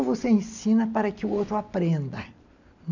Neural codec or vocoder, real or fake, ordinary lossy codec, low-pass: none; real; none; 7.2 kHz